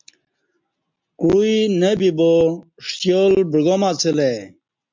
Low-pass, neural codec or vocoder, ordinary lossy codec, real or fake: 7.2 kHz; none; MP3, 64 kbps; real